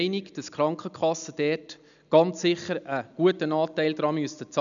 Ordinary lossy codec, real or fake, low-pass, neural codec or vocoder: none; real; 7.2 kHz; none